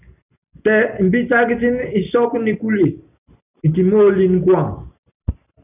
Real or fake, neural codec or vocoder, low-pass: real; none; 3.6 kHz